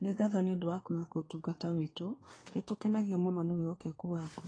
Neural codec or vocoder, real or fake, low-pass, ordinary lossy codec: codec, 24 kHz, 1 kbps, SNAC; fake; 9.9 kHz; AAC, 32 kbps